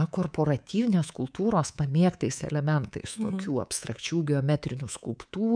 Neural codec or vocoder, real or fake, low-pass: codec, 24 kHz, 3.1 kbps, DualCodec; fake; 9.9 kHz